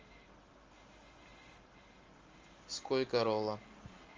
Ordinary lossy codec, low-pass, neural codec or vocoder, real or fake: Opus, 32 kbps; 7.2 kHz; none; real